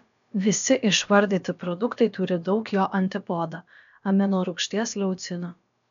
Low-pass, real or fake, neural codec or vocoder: 7.2 kHz; fake; codec, 16 kHz, about 1 kbps, DyCAST, with the encoder's durations